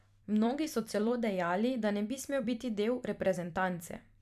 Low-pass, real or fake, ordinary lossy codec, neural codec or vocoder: 14.4 kHz; fake; none; vocoder, 44.1 kHz, 128 mel bands every 256 samples, BigVGAN v2